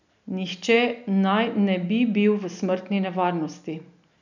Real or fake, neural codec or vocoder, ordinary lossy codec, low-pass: real; none; none; 7.2 kHz